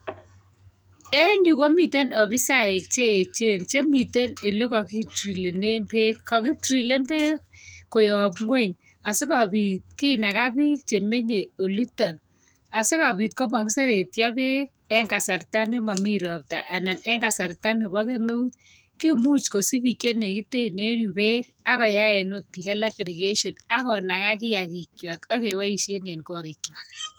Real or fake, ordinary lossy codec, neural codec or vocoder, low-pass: fake; none; codec, 44.1 kHz, 2.6 kbps, SNAC; none